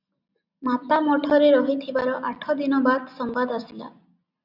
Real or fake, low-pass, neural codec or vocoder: real; 5.4 kHz; none